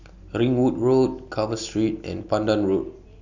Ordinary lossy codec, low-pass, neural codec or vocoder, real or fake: none; 7.2 kHz; none; real